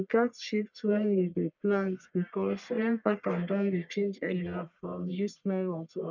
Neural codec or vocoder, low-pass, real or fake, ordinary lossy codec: codec, 44.1 kHz, 1.7 kbps, Pupu-Codec; 7.2 kHz; fake; none